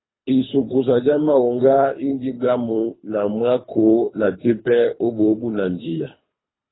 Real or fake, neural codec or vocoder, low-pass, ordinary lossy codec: fake; codec, 24 kHz, 3 kbps, HILCodec; 7.2 kHz; AAC, 16 kbps